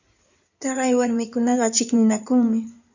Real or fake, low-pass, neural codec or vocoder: fake; 7.2 kHz; codec, 16 kHz in and 24 kHz out, 2.2 kbps, FireRedTTS-2 codec